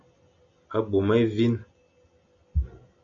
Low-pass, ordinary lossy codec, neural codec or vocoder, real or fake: 7.2 kHz; AAC, 64 kbps; none; real